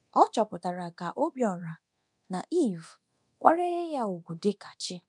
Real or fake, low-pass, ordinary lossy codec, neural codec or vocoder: fake; 10.8 kHz; MP3, 96 kbps; codec, 24 kHz, 0.9 kbps, DualCodec